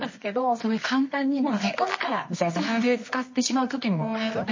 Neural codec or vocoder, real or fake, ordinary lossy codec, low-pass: codec, 24 kHz, 0.9 kbps, WavTokenizer, medium music audio release; fake; MP3, 32 kbps; 7.2 kHz